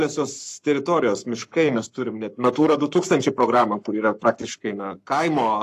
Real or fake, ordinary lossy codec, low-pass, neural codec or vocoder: fake; AAC, 64 kbps; 14.4 kHz; codec, 44.1 kHz, 7.8 kbps, Pupu-Codec